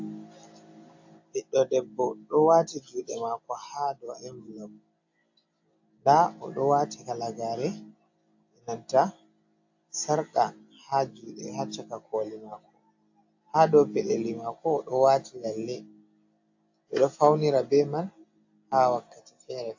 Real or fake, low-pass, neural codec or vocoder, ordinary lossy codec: real; 7.2 kHz; none; AAC, 48 kbps